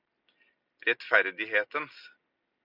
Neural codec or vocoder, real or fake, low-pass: none; real; 5.4 kHz